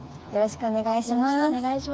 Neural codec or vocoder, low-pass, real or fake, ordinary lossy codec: codec, 16 kHz, 4 kbps, FreqCodec, smaller model; none; fake; none